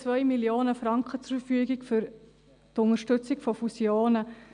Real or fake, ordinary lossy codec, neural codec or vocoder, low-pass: real; none; none; 9.9 kHz